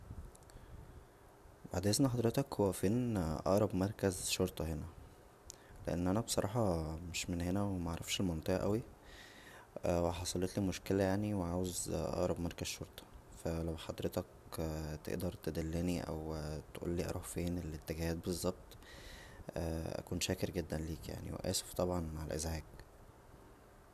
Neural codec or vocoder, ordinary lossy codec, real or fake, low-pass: none; none; real; 14.4 kHz